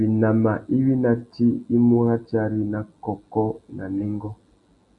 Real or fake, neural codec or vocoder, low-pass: real; none; 10.8 kHz